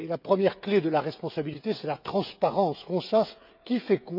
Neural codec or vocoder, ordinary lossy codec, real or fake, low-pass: codec, 16 kHz, 16 kbps, FreqCodec, smaller model; none; fake; 5.4 kHz